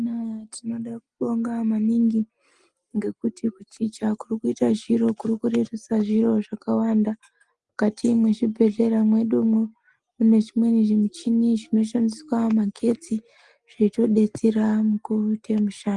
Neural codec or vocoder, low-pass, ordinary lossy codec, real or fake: none; 10.8 kHz; Opus, 24 kbps; real